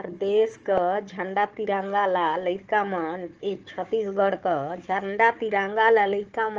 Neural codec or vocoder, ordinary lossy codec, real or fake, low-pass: codec, 16 kHz, 4 kbps, FunCodec, trained on Chinese and English, 50 frames a second; Opus, 24 kbps; fake; 7.2 kHz